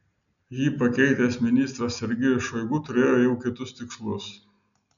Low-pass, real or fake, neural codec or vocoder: 7.2 kHz; real; none